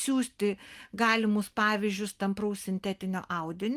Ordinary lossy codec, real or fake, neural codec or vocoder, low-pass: Opus, 32 kbps; real; none; 14.4 kHz